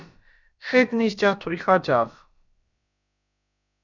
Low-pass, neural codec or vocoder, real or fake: 7.2 kHz; codec, 16 kHz, about 1 kbps, DyCAST, with the encoder's durations; fake